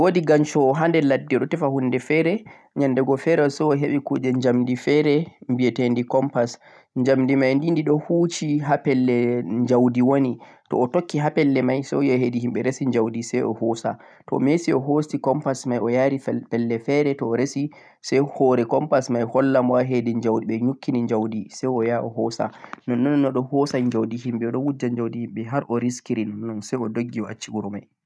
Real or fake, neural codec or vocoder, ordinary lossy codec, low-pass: real; none; none; none